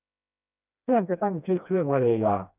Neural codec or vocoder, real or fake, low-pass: codec, 16 kHz, 1 kbps, FreqCodec, smaller model; fake; 3.6 kHz